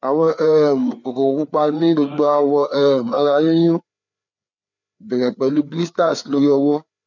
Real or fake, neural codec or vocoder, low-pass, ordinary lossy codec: fake; codec, 16 kHz, 4 kbps, FreqCodec, larger model; 7.2 kHz; none